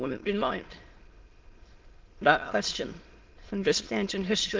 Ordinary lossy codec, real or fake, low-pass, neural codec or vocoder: Opus, 16 kbps; fake; 7.2 kHz; autoencoder, 22.05 kHz, a latent of 192 numbers a frame, VITS, trained on many speakers